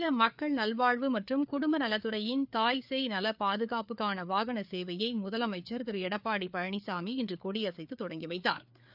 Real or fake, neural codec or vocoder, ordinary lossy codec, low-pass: fake; codec, 16 kHz, 4 kbps, FreqCodec, larger model; none; 5.4 kHz